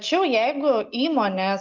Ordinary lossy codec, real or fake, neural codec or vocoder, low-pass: Opus, 32 kbps; real; none; 7.2 kHz